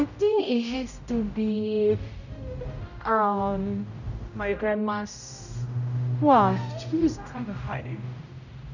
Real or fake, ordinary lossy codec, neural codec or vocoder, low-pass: fake; none; codec, 16 kHz, 0.5 kbps, X-Codec, HuBERT features, trained on general audio; 7.2 kHz